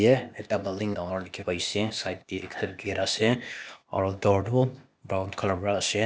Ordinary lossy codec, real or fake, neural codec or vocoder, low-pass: none; fake; codec, 16 kHz, 0.8 kbps, ZipCodec; none